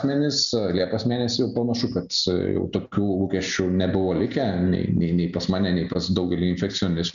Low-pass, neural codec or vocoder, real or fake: 7.2 kHz; none; real